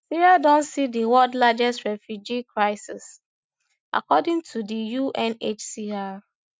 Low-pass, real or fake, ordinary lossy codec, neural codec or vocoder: none; real; none; none